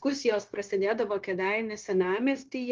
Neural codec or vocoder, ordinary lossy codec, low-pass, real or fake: codec, 16 kHz, 0.9 kbps, LongCat-Audio-Codec; Opus, 24 kbps; 7.2 kHz; fake